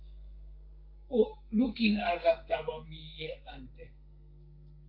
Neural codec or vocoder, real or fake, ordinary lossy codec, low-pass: codec, 32 kHz, 1.9 kbps, SNAC; fake; MP3, 48 kbps; 5.4 kHz